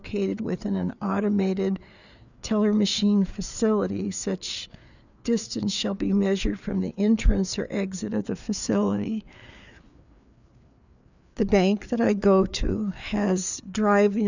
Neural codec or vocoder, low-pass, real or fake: codec, 16 kHz, 4 kbps, FreqCodec, larger model; 7.2 kHz; fake